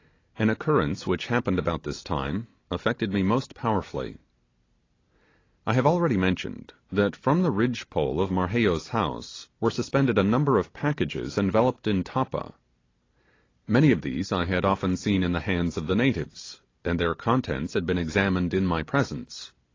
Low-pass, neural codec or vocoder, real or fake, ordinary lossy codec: 7.2 kHz; none; real; AAC, 32 kbps